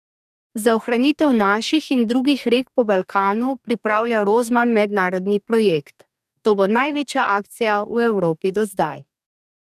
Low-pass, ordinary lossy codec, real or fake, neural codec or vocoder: 14.4 kHz; none; fake; codec, 44.1 kHz, 2.6 kbps, DAC